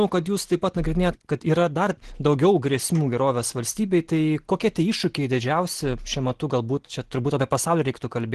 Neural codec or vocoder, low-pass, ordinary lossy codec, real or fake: none; 10.8 kHz; Opus, 16 kbps; real